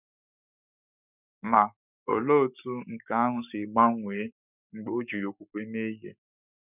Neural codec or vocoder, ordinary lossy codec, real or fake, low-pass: codec, 44.1 kHz, 7.8 kbps, Pupu-Codec; none; fake; 3.6 kHz